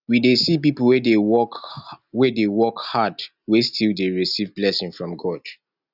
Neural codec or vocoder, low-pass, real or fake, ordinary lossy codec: none; 5.4 kHz; real; none